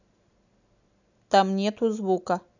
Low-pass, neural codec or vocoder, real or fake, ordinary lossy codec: 7.2 kHz; none; real; none